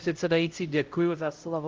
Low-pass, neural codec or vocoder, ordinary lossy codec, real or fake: 7.2 kHz; codec, 16 kHz, 0.5 kbps, X-Codec, HuBERT features, trained on LibriSpeech; Opus, 32 kbps; fake